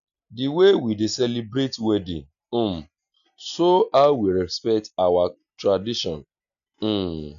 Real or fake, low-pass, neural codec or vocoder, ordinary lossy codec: real; 7.2 kHz; none; none